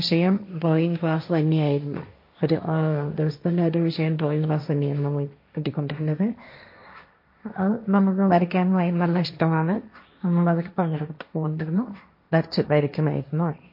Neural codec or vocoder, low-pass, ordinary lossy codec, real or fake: codec, 16 kHz, 1.1 kbps, Voila-Tokenizer; 5.4 kHz; MP3, 32 kbps; fake